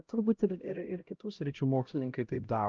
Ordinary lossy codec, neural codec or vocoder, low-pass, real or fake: Opus, 24 kbps; codec, 16 kHz, 0.5 kbps, X-Codec, HuBERT features, trained on LibriSpeech; 7.2 kHz; fake